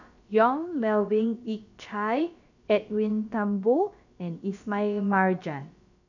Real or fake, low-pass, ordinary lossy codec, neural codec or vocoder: fake; 7.2 kHz; none; codec, 16 kHz, about 1 kbps, DyCAST, with the encoder's durations